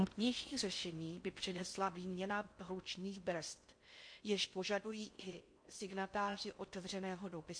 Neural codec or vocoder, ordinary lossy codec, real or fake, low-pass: codec, 16 kHz in and 24 kHz out, 0.6 kbps, FocalCodec, streaming, 4096 codes; MP3, 48 kbps; fake; 9.9 kHz